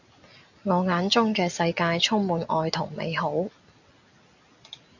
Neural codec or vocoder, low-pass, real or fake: none; 7.2 kHz; real